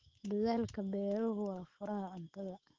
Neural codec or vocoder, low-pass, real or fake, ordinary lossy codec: autoencoder, 48 kHz, 128 numbers a frame, DAC-VAE, trained on Japanese speech; 7.2 kHz; fake; Opus, 16 kbps